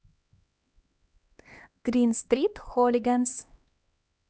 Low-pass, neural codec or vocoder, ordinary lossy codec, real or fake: none; codec, 16 kHz, 2 kbps, X-Codec, HuBERT features, trained on LibriSpeech; none; fake